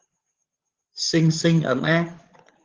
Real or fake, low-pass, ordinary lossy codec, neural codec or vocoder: fake; 7.2 kHz; Opus, 16 kbps; codec, 16 kHz, 8 kbps, FreqCodec, larger model